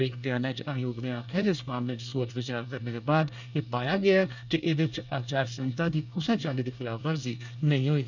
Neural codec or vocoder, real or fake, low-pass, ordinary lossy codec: codec, 24 kHz, 1 kbps, SNAC; fake; 7.2 kHz; none